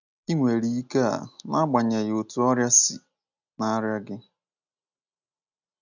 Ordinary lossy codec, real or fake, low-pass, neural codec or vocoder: none; real; 7.2 kHz; none